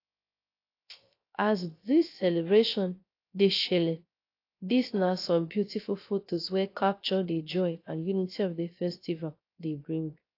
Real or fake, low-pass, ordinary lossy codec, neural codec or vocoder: fake; 5.4 kHz; AAC, 32 kbps; codec, 16 kHz, 0.3 kbps, FocalCodec